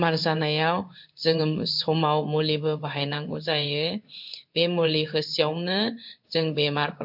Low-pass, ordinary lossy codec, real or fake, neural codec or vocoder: 5.4 kHz; MP3, 48 kbps; fake; codec, 16 kHz in and 24 kHz out, 1 kbps, XY-Tokenizer